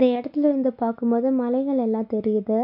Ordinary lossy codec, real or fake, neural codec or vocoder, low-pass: none; real; none; 5.4 kHz